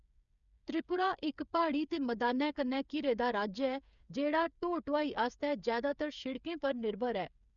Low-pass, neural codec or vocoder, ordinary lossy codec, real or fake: 7.2 kHz; codec, 16 kHz, 8 kbps, FreqCodec, smaller model; none; fake